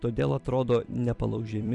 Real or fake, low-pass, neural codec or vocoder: fake; 10.8 kHz; vocoder, 44.1 kHz, 128 mel bands every 256 samples, BigVGAN v2